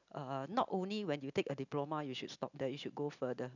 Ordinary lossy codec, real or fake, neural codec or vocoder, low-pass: none; real; none; 7.2 kHz